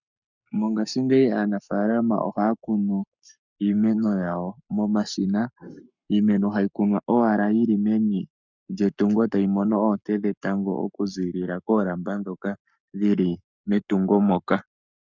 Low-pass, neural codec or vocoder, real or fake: 7.2 kHz; codec, 44.1 kHz, 7.8 kbps, Pupu-Codec; fake